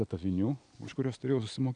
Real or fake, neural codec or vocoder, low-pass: real; none; 9.9 kHz